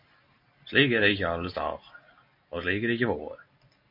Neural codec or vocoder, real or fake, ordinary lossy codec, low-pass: none; real; MP3, 32 kbps; 5.4 kHz